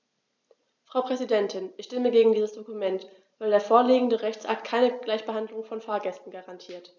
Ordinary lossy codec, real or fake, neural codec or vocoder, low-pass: none; real; none; 7.2 kHz